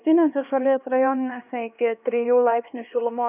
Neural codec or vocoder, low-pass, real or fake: codec, 16 kHz, 2 kbps, X-Codec, HuBERT features, trained on LibriSpeech; 3.6 kHz; fake